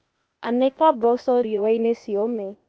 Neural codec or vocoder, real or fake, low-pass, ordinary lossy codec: codec, 16 kHz, 0.8 kbps, ZipCodec; fake; none; none